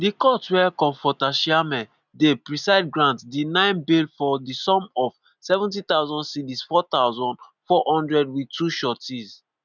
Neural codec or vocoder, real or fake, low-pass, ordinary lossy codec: none; real; 7.2 kHz; none